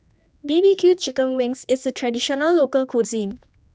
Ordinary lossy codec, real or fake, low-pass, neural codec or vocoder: none; fake; none; codec, 16 kHz, 2 kbps, X-Codec, HuBERT features, trained on general audio